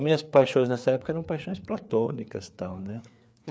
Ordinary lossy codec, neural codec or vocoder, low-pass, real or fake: none; codec, 16 kHz, 4 kbps, FreqCodec, larger model; none; fake